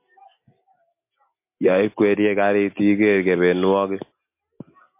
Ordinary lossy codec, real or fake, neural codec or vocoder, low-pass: MP3, 24 kbps; real; none; 3.6 kHz